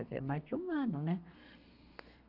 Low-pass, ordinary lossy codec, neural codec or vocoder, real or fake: 5.4 kHz; none; codec, 32 kHz, 1.9 kbps, SNAC; fake